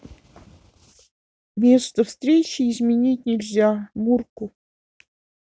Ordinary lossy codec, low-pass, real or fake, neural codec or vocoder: none; none; real; none